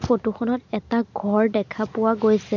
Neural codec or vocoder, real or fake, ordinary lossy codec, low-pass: none; real; MP3, 64 kbps; 7.2 kHz